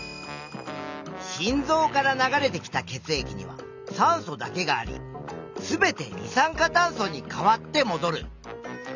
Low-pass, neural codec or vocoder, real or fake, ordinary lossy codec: 7.2 kHz; none; real; none